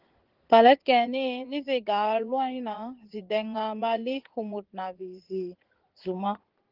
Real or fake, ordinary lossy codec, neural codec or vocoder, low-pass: fake; Opus, 16 kbps; vocoder, 44.1 kHz, 80 mel bands, Vocos; 5.4 kHz